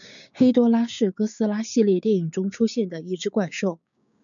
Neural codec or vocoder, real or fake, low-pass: codec, 16 kHz, 6 kbps, DAC; fake; 7.2 kHz